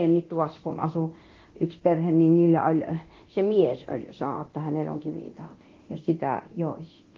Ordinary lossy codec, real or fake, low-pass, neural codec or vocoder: Opus, 16 kbps; fake; 7.2 kHz; codec, 24 kHz, 0.9 kbps, DualCodec